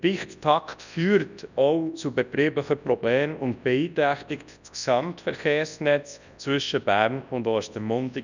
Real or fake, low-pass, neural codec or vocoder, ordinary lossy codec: fake; 7.2 kHz; codec, 24 kHz, 0.9 kbps, WavTokenizer, large speech release; none